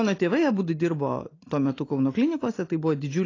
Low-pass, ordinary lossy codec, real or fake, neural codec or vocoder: 7.2 kHz; AAC, 32 kbps; real; none